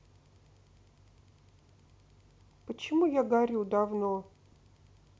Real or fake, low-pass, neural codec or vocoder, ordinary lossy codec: real; none; none; none